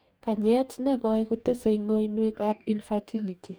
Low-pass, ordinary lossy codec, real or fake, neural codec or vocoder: none; none; fake; codec, 44.1 kHz, 2.6 kbps, DAC